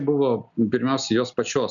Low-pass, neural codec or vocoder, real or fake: 7.2 kHz; none; real